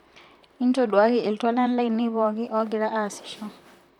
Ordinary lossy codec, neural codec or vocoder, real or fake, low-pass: none; vocoder, 44.1 kHz, 128 mel bands, Pupu-Vocoder; fake; 19.8 kHz